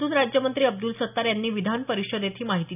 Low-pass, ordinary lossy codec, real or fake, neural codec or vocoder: 3.6 kHz; none; real; none